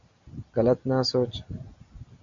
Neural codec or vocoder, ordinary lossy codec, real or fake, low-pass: none; MP3, 64 kbps; real; 7.2 kHz